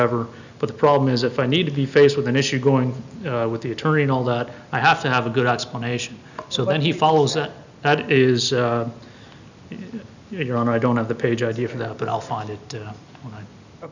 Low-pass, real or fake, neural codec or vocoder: 7.2 kHz; real; none